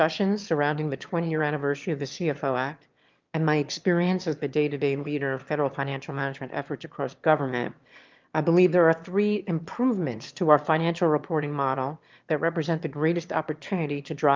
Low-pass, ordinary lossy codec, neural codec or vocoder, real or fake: 7.2 kHz; Opus, 24 kbps; autoencoder, 22.05 kHz, a latent of 192 numbers a frame, VITS, trained on one speaker; fake